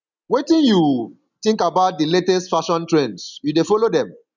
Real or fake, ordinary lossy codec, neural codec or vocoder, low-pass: real; none; none; 7.2 kHz